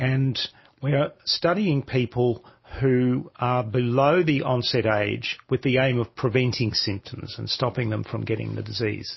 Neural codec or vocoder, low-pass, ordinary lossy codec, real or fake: none; 7.2 kHz; MP3, 24 kbps; real